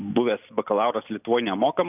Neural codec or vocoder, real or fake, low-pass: none; real; 3.6 kHz